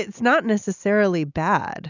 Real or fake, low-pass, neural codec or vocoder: real; 7.2 kHz; none